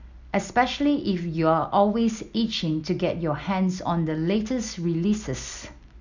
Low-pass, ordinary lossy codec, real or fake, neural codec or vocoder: 7.2 kHz; none; real; none